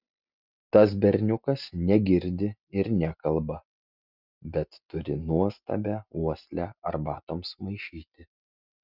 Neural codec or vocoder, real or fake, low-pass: none; real; 5.4 kHz